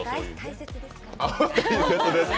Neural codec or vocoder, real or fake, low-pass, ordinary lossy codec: none; real; none; none